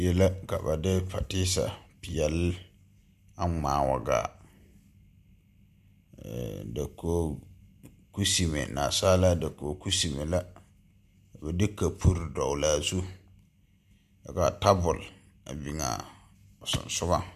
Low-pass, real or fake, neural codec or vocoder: 14.4 kHz; real; none